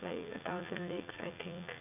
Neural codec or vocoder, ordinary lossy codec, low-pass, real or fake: vocoder, 44.1 kHz, 80 mel bands, Vocos; none; 3.6 kHz; fake